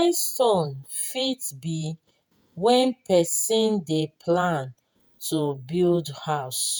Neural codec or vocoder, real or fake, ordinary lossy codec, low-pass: vocoder, 48 kHz, 128 mel bands, Vocos; fake; none; none